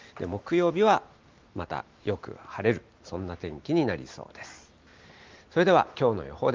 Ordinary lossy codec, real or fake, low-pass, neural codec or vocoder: Opus, 32 kbps; real; 7.2 kHz; none